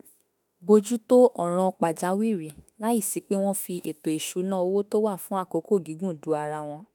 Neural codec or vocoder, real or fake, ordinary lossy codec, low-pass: autoencoder, 48 kHz, 32 numbers a frame, DAC-VAE, trained on Japanese speech; fake; none; none